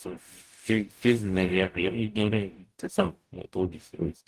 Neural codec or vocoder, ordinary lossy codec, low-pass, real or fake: codec, 44.1 kHz, 0.9 kbps, DAC; Opus, 24 kbps; 14.4 kHz; fake